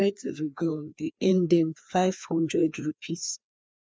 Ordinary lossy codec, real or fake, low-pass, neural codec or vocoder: none; fake; none; codec, 16 kHz, 2 kbps, FreqCodec, larger model